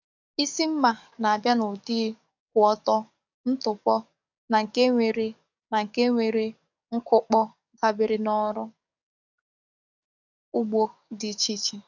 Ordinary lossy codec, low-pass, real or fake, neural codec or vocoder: none; 7.2 kHz; fake; codec, 44.1 kHz, 7.8 kbps, DAC